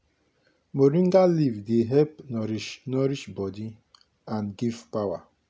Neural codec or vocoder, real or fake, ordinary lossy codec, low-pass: none; real; none; none